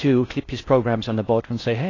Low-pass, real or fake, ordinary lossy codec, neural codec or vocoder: 7.2 kHz; fake; AAC, 32 kbps; codec, 16 kHz in and 24 kHz out, 0.6 kbps, FocalCodec, streaming, 4096 codes